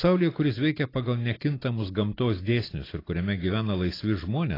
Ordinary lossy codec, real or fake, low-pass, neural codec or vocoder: AAC, 24 kbps; real; 5.4 kHz; none